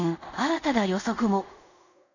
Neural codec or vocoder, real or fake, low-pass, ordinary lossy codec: codec, 24 kHz, 0.5 kbps, DualCodec; fake; 7.2 kHz; none